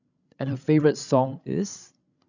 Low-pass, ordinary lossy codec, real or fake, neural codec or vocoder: 7.2 kHz; none; fake; codec, 16 kHz, 16 kbps, FreqCodec, larger model